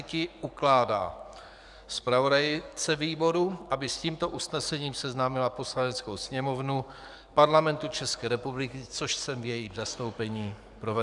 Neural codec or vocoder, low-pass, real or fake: codec, 44.1 kHz, 7.8 kbps, DAC; 10.8 kHz; fake